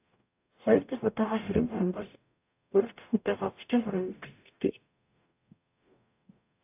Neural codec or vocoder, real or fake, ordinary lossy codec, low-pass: codec, 44.1 kHz, 0.9 kbps, DAC; fake; AAC, 32 kbps; 3.6 kHz